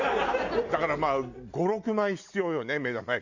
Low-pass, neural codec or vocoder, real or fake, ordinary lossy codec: 7.2 kHz; none; real; Opus, 64 kbps